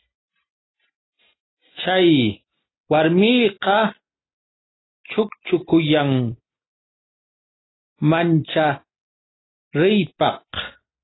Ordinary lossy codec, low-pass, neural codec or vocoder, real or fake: AAC, 16 kbps; 7.2 kHz; none; real